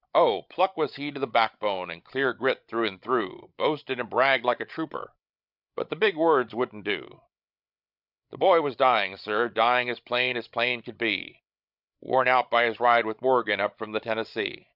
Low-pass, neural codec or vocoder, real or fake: 5.4 kHz; codec, 16 kHz, 16 kbps, FreqCodec, larger model; fake